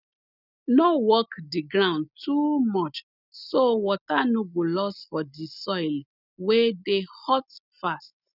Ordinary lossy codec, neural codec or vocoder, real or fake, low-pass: none; none; real; 5.4 kHz